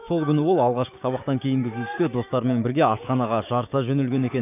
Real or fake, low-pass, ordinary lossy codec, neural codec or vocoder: fake; 3.6 kHz; none; codec, 16 kHz, 6 kbps, DAC